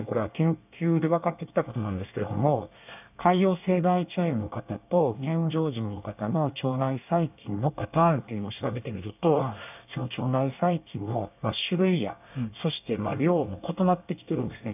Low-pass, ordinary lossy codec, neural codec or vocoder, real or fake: 3.6 kHz; none; codec, 24 kHz, 1 kbps, SNAC; fake